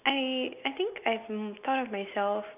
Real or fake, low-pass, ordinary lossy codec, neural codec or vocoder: real; 3.6 kHz; none; none